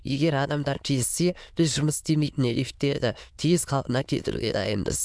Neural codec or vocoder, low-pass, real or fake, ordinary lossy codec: autoencoder, 22.05 kHz, a latent of 192 numbers a frame, VITS, trained on many speakers; none; fake; none